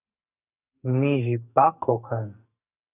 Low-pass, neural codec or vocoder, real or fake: 3.6 kHz; codec, 44.1 kHz, 2.6 kbps, SNAC; fake